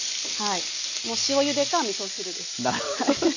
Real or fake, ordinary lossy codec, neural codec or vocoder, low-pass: real; none; none; 7.2 kHz